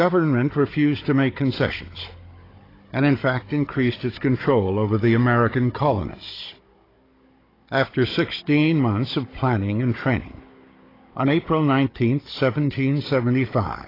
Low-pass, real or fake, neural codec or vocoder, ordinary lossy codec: 5.4 kHz; fake; codec, 16 kHz, 16 kbps, FunCodec, trained on Chinese and English, 50 frames a second; AAC, 24 kbps